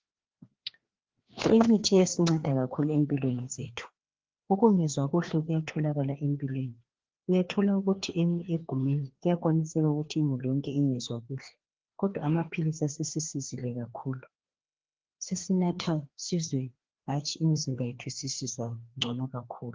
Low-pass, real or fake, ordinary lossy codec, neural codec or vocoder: 7.2 kHz; fake; Opus, 16 kbps; codec, 16 kHz, 2 kbps, FreqCodec, larger model